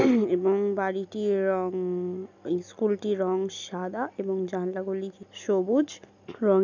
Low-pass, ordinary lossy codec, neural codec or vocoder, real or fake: 7.2 kHz; none; none; real